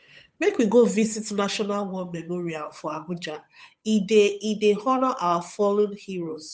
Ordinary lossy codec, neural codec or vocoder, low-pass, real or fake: none; codec, 16 kHz, 8 kbps, FunCodec, trained on Chinese and English, 25 frames a second; none; fake